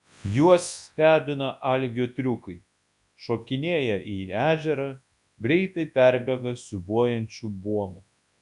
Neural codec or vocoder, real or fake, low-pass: codec, 24 kHz, 0.9 kbps, WavTokenizer, large speech release; fake; 10.8 kHz